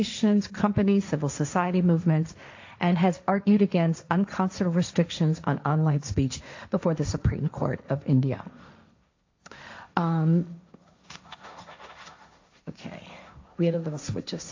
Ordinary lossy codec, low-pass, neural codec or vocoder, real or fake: AAC, 48 kbps; 7.2 kHz; codec, 16 kHz, 1.1 kbps, Voila-Tokenizer; fake